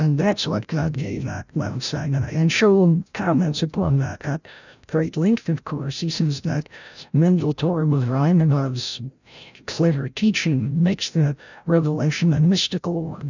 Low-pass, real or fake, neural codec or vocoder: 7.2 kHz; fake; codec, 16 kHz, 0.5 kbps, FreqCodec, larger model